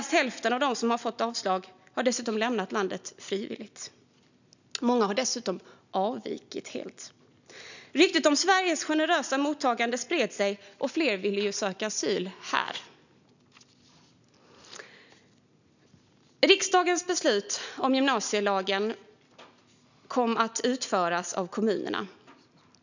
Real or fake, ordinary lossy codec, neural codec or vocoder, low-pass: real; none; none; 7.2 kHz